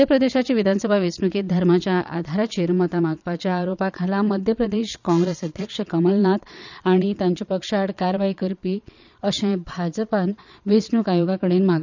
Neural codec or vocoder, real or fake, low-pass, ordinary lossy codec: vocoder, 22.05 kHz, 80 mel bands, Vocos; fake; 7.2 kHz; none